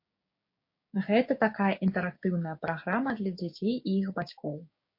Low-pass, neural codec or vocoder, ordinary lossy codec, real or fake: 5.4 kHz; codec, 16 kHz, 6 kbps, DAC; MP3, 32 kbps; fake